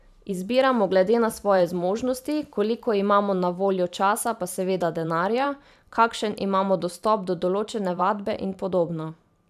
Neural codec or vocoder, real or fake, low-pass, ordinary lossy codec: vocoder, 44.1 kHz, 128 mel bands every 512 samples, BigVGAN v2; fake; 14.4 kHz; none